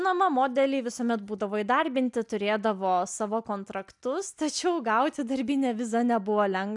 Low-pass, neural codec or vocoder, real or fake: 10.8 kHz; none; real